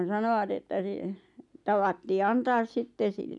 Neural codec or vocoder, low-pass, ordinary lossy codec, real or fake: none; 10.8 kHz; none; real